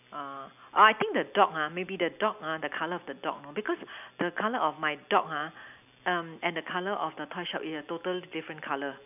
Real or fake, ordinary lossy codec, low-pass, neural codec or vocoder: real; none; 3.6 kHz; none